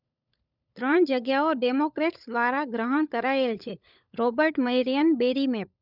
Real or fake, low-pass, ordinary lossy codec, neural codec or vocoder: fake; 5.4 kHz; none; codec, 16 kHz, 16 kbps, FunCodec, trained on LibriTTS, 50 frames a second